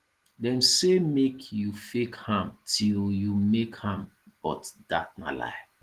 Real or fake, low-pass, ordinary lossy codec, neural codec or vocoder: real; 14.4 kHz; Opus, 16 kbps; none